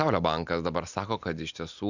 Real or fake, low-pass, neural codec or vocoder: real; 7.2 kHz; none